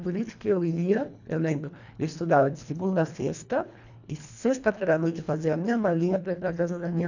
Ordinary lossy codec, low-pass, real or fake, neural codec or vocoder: none; 7.2 kHz; fake; codec, 24 kHz, 1.5 kbps, HILCodec